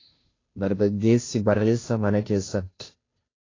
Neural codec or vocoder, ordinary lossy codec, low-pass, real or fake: codec, 16 kHz, 0.5 kbps, FunCodec, trained on Chinese and English, 25 frames a second; AAC, 32 kbps; 7.2 kHz; fake